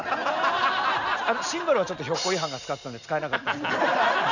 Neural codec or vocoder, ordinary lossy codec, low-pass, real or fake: none; none; 7.2 kHz; real